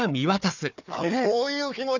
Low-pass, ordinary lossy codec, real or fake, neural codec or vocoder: 7.2 kHz; none; fake; codec, 16 kHz, 4 kbps, FunCodec, trained on Chinese and English, 50 frames a second